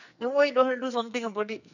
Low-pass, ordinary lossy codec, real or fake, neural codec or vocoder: 7.2 kHz; none; fake; codec, 44.1 kHz, 2.6 kbps, SNAC